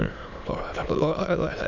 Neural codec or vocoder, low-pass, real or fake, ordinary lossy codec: autoencoder, 22.05 kHz, a latent of 192 numbers a frame, VITS, trained on many speakers; 7.2 kHz; fake; none